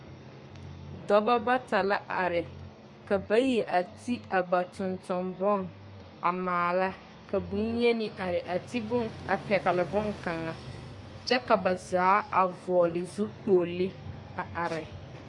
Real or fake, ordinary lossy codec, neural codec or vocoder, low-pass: fake; MP3, 48 kbps; codec, 32 kHz, 1.9 kbps, SNAC; 10.8 kHz